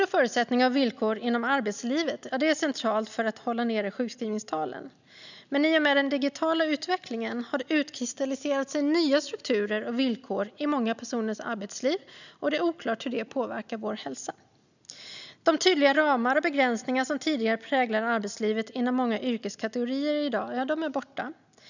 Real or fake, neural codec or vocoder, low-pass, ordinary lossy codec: real; none; 7.2 kHz; none